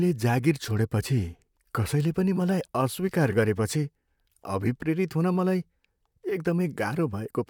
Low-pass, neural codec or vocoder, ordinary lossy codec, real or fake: 19.8 kHz; vocoder, 44.1 kHz, 128 mel bands, Pupu-Vocoder; none; fake